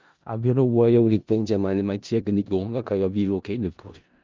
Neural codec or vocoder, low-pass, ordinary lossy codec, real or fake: codec, 16 kHz in and 24 kHz out, 0.4 kbps, LongCat-Audio-Codec, four codebook decoder; 7.2 kHz; Opus, 24 kbps; fake